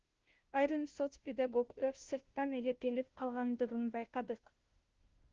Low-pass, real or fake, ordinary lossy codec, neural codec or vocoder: 7.2 kHz; fake; Opus, 16 kbps; codec, 16 kHz, 0.5 kbps, FunCodec, trained on Chinese and English, 25 frames a second